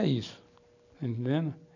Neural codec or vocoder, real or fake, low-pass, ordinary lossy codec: none; real; 7.2 kHz; none